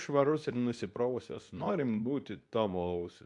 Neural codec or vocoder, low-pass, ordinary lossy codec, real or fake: codec, 24 kHz, 0.9 kbps, WavTokenizer, medium speech release version 2; 10.8 kHz; AAC, 64 kbps; fake